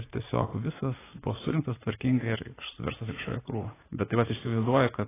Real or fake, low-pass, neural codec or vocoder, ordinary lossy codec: fake; 3.6 kHz; vocoder, 44.1 kHz, 80 mel bands, Vocos; AAC, 16 kbps